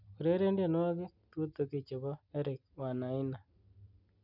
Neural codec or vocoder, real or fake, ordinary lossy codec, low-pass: none; real; none; 5.4 kHz